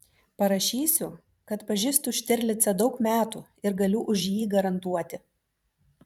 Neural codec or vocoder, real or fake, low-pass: vocoder, 44.1 kHz, 128 mel bands every 512 samples, BigVGAN v2; fake; 19.8 kHz